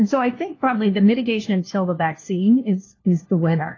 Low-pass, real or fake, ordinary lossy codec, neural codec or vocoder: 7.2 kHz; fake; AAC, 32 kbps; codec, 16 kHz, 1 kbps, FunCodec, trained on LibriTTS, 50 frames a second